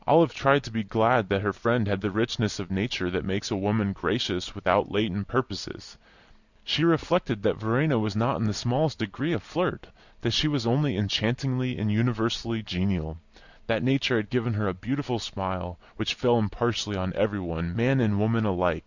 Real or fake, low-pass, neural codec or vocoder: real; 7.2 kHz; none